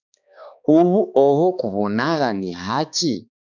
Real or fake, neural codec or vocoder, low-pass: fake; codec, 16 kHz, 2 kbps, X-Codec, HuBERT features, trained on balanced general audio; 7.2 kHz